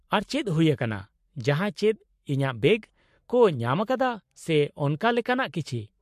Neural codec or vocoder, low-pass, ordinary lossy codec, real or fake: codec, 44.1 kHz, 7.8 kbps, Pupu-Codec; 14.4 kHz; MP3, 64 kbps; fake